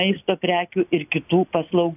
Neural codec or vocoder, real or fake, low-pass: none; real; 3.6 kHz